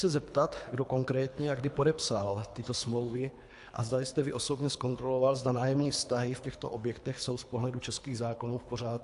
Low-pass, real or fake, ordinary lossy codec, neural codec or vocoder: 10.8 kHz; fake; AAC, 64 kbps; codec, 24 kHz, 3 kbps, HILCodec